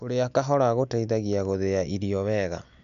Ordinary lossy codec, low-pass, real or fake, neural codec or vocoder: none; 7.2 kHz; real; none